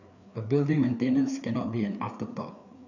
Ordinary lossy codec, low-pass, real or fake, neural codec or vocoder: none; 7.2 kHz; fake; codec, 16 kHz, 4 kbps, FreqCodec, larger model